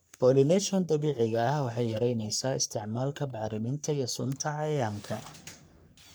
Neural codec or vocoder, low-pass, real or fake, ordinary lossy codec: codec, 44.1 kHz, 3.4 kbps, Pupu-Codec; none; fake; none